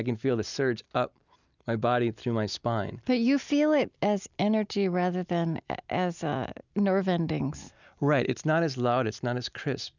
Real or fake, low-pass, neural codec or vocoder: real; 7.2 kHz; none